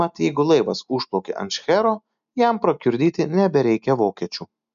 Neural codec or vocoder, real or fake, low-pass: none; real; 7.2 kHz